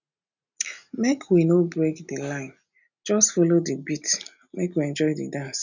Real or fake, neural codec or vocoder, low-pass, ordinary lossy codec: real; none; 7.2 kHz; none